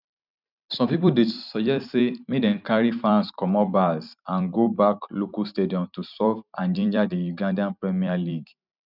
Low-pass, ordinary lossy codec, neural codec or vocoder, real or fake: 5.4 kHz; none; vocoder, 44.1 kHz, 128 mel bands every 256 samples, BigVGAN v2; fake